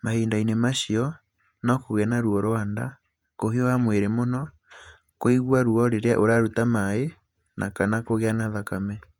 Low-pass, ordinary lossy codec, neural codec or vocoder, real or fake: 19.8 kHz; none; vocoder, 48 kHz, 128 mel bands, Vocos; fake